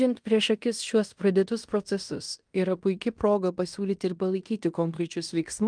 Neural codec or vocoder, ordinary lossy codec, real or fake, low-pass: codec, 16 kHz in and 24 kHz out, 0.9 kbps, LongCat-Audio-Codec, fine tuned four codebook decoder; Opus, 32 kbps; fake; 9.9 kHz